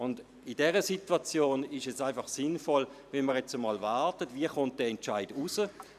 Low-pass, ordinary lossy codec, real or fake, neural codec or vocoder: 14.4 kHz; none; fake; vocoder, 44.1 kHz, 128 mel bands every 512 samples, BigVGAN v2